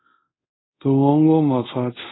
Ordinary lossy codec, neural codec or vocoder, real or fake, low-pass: AAC, 16 kbps; codec, 24 kHz, 0.5 kbps, DualCodec; fake; 7.2 kHz